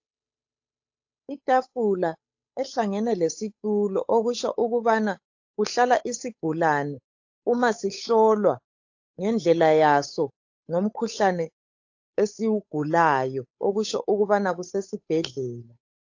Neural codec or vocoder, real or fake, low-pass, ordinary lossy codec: codec, 16 kHz, 8 kbps, FunCodec, trained on Chinese and English, 25 frames a second; fake; 7.2 kHz; AAC, 48 kbps